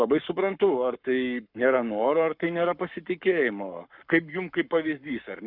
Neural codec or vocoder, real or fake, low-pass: codec, 44.1 kHz, 7.8 kbps, Pupu-Codec; fake; 5.4 kHz